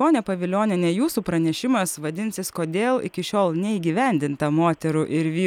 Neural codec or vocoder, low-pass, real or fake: none; 19.8 kHz; real